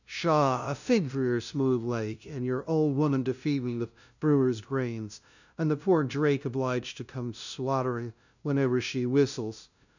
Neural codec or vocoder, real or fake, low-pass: codec, 16 kHz, 0.5 kbps, FunCodec, trained on LibriTTS, 25 frames a second; fake; 7.2 kHz